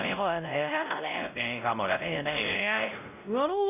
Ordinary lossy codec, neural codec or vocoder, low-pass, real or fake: none; codec, 16 kHz, 0.5 kbps, X-Codec, WavLM features, trained on Multilingual LibriSpeech; 3.6 kHz; fake